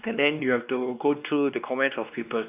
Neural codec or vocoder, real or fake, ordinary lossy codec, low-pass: codec, 16 kHz, 2 kbps, X-Codec, WavLM features, trained on Multilingual LibriSpeech; fake; none; 3.6 kHz